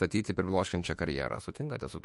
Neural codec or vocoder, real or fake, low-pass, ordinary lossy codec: autoencoder, 48 kHz, 32 numbers a frame, DAC-VAE, trained on Japanese speech; fake; 14.4 kHz; MP3, 48 kbps